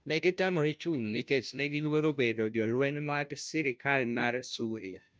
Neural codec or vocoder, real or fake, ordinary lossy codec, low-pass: codec, 16 kHz, 0.5 kbps, FunCodec, trained on Chinese and English, 25 frames a second; fake; none; none